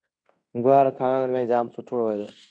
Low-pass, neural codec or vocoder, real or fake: 9.9 kHz; codec, 16 kHz in and 24 kHz out, 0.9 kbps, LongCat-Audio-Codec, fine tuned four codebook decoder; fake